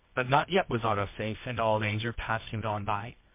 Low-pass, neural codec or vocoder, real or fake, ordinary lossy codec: 3.6 kHz; codec, 24 kHz, 0.9 kbps, WavTokenizer, medium music audio release; fake; MP3, 24 kbps